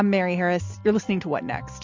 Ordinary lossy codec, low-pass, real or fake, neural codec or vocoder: MP3, 64 kbps; 7.2 kHz; real; none